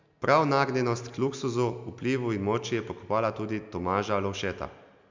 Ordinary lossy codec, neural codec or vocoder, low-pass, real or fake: MP3, 64 kbps; none; 7.2 kHz; real